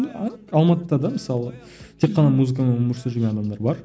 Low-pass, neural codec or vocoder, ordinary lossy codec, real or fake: none; none; none; real